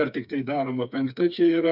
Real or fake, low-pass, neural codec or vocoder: fake; 5.4 kHz; codec, 16 kHz, 4 kbps, FreqCodec, smaller model